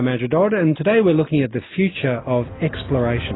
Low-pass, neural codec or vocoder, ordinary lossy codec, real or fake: 7.2 kHz; none; AAC, 16 kbps; real